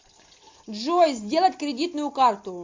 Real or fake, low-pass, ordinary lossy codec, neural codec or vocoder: real; 7.2 kHz; MP3, 48 kbps; none